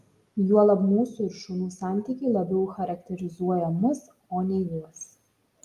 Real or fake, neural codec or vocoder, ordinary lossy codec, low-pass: real; none; Opus, 32 kbps; 14.4 kHz